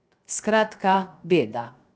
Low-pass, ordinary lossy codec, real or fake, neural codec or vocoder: none; none; fake; codec, 16 kHz, 0.7 kbps, FocalCodec